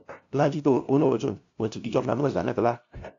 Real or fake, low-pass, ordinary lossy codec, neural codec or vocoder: fake; 7.2 kHz; AAC, 64 kbps; codec, 16 kHz, 0.5 kbps, FunCodec, trained on LibriTTS, 25 frames a second